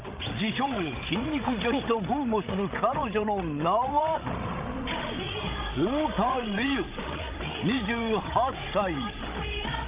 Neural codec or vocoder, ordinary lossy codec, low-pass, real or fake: codec, 16 kHz, 16 kbps, FreqCodec, larger model; Opus, 24 kbps; 3.6 kHz; fake